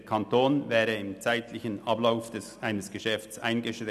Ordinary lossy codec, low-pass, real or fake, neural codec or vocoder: none; 14.4 kHz; real; none